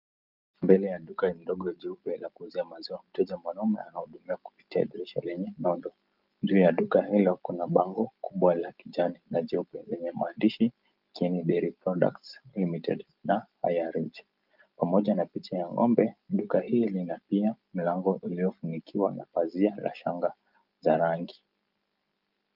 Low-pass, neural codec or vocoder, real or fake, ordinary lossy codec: 5.4 kHz; none; real; Opus, 24 kbps